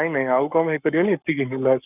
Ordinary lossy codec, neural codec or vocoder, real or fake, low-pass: none; codec, 44.1 kHz, 7.8 kbps, Pupu-Codec; fake; 3.6 kHz